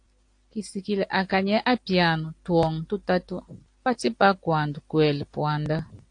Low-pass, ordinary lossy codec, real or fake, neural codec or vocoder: 9.9 kHz; AAC, 48 kbps; real; none